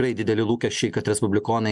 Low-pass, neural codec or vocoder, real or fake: 10.8 kHz; none; real